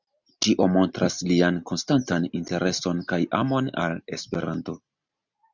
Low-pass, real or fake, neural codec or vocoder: 7.2 kHz; real; none